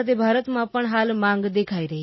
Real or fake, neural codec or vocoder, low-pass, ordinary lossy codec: real; none; 7.2 kHz; MP3, 24 kbps